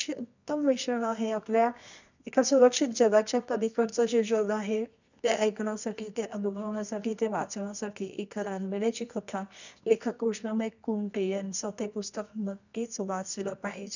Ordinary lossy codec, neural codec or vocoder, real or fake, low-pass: none; codec, 24 kHz, 0.9 kbps, WavTokenizer, medium music audio release; fake; 7.2 kHz